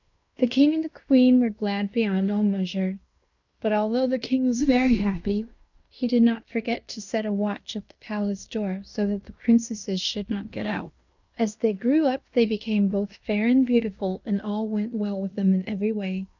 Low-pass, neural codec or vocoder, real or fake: 7.2 kHz; codec, 16 kHz in and 24 kHz out, 0.9 kbps, LongCat-Audio-Codec, fine tuned four codebook decoder; fake